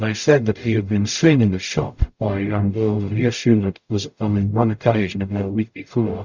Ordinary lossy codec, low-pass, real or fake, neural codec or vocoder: Opus, 64 kbps; 7.2 kHz; fake; codec, 44.1 kHz, 0.9 kbps, DAC